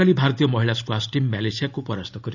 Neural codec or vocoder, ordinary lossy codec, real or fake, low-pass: none; none; real; 7.2 kHz